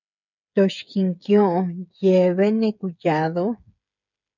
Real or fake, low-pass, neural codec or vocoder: fake; 7.2 kHz; codec, 16 kHz, 8 kbps, FreqCodec, smaller model